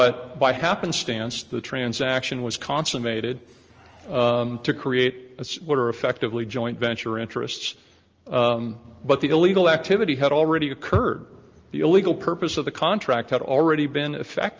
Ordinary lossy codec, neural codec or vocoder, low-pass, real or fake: Opus, 24 kbps; none; 7.2 kHz; real